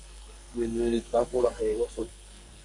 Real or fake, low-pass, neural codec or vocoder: fake; 10.8 kHz; codec, 44.1 kHz, 2.6 kbps, SNAC